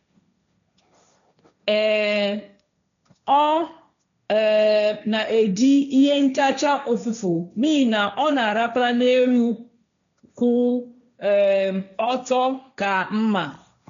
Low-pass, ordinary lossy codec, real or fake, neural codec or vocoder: 7.2 kHz; none; fake; codec, 16 kHz, 1.1 kbps, Voila-Tokenizer